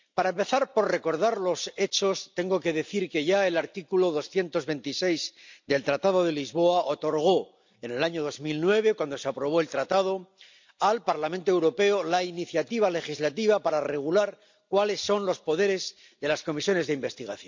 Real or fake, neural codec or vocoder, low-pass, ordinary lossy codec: real; none; 7.2 kHz; MP3, 64 kbps